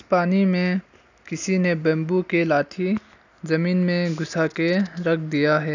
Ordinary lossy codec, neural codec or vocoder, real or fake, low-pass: none; none; real; 7.2 kHz